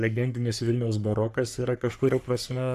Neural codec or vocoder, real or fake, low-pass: codec, 44.1 kHz, 3.4 kbps, Pupu-Codec; fake; 14.4 kHz